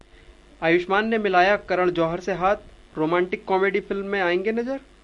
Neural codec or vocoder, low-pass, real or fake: none; 10.8 kHz; real